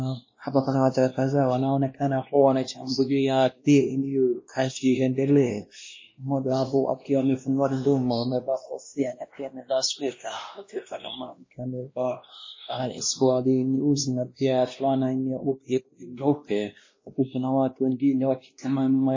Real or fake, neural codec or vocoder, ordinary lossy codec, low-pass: fake; codec, 16 kHz, 1 kbps, X-Codec, WavLM features, trained on Multilingual LibriSpeech; MP3, 32 kbps; 7.2 kHz